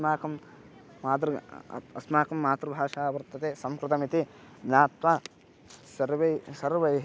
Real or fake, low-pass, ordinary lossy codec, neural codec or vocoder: real; none; none; none